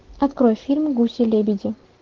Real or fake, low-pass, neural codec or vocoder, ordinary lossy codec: real; 7.2 kHz; none; Opus, 16 kbps